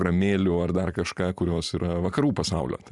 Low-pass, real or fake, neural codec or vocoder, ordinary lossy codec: 10.8 kHz; fake; vocoder, 44.1 kHz, 128 mel bands every 512 samples, BigVGAN v2; Opus, 64 kbps